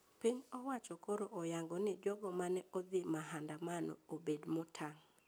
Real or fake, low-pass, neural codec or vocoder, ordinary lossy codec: real; none; none; none